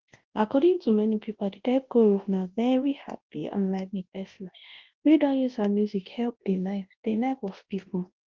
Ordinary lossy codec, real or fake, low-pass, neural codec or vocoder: Opus, 16 kbps; fake; 7.2 kHz; codec, 24 kHz, 0.9 kbps, WavTokenizer, large speech release